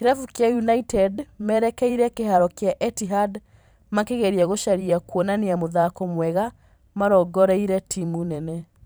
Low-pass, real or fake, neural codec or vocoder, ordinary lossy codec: none; fake; vocoder, 44.1 kHz, 128 mel bands every 512 samples, BigVGAN v2; none